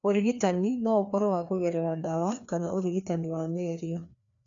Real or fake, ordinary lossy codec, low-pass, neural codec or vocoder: fake; MP3, 64 kbps; 7.2 kHz; codec, 16 kHz, 2 kbps, FreqCodec, larger model